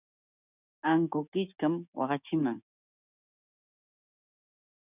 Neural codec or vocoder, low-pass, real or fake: codec, 16 kHz, 6 kbps, DAC; 3.6 kHz; fake